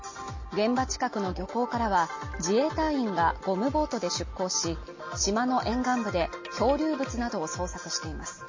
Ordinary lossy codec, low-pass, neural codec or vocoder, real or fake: MP3, 32 kbps; 7.2 kHz; none; real